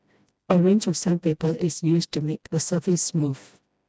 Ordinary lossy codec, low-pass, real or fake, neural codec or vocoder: none; none; fake; codec, 16 kHz, 1 kbps, FreqCodec, smaller model